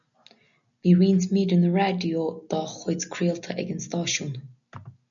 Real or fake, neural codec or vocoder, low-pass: real; none; 7.2 kHz